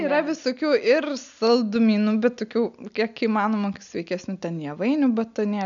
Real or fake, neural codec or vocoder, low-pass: real; none; 7.2 kHz